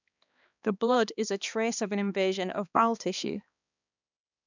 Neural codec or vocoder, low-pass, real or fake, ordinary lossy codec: codec, 16 kHz, 2 kbps, X-Codec, HuBERT features, trained on balanced general audio; 7.2 kHz; fake; none